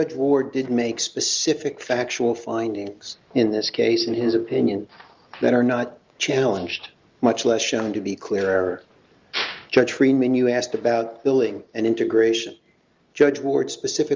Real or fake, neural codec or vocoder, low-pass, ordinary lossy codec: real; none; 7.2 kHz; Opus, 32 kbps